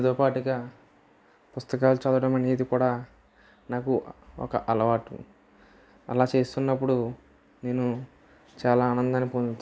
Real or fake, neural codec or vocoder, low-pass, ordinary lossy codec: real; none; none; none